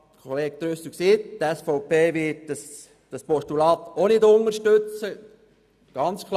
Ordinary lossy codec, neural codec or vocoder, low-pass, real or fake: none; none; 14.4 kHz; real